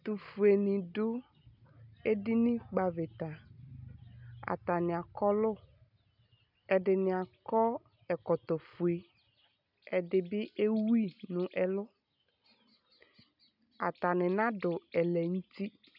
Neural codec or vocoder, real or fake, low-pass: none; real; 5.4 kHz